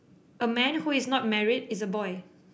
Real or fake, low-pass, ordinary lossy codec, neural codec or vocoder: real; none; none; none